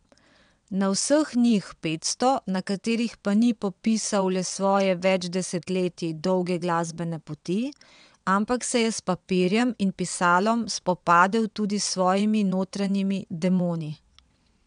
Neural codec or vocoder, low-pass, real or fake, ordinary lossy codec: vocoder, 22.05 kHz, 80 mel bands, WaveNeXt; 9.9 kHz; fake; MP3, 96 kbps